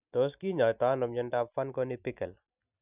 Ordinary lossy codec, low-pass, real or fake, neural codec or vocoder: none; 3.6 kHz; real; none